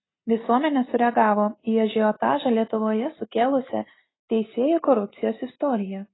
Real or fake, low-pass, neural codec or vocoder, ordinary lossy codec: real; 7.2 kHz; none; AAC, 16 kbps